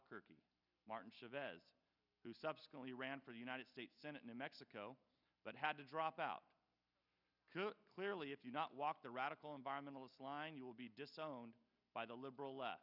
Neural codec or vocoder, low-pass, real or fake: none; 5.4 kHz; real